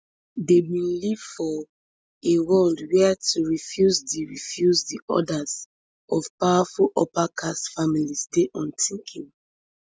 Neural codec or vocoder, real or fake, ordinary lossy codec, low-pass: none; real; none; none